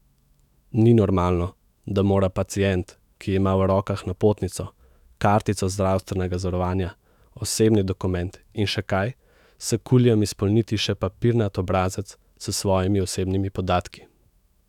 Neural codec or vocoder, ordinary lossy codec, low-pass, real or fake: autoencoder, 48 kHz, 128 numbers a frame, DAC-VAE, trained on Japanese speech; none; 19.8 kHz; fake